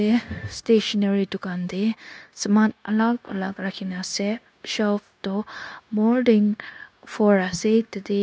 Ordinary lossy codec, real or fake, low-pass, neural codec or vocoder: none; fake; none; codec, 16 kHz, 0.9 kbps, LongCat-Audio-Codec